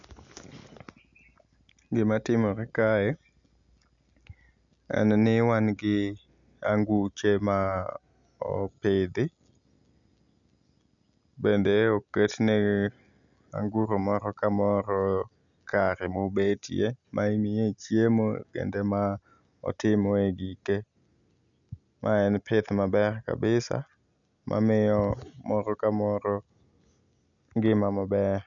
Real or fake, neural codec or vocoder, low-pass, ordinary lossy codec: real; none; 7.2 kHz; none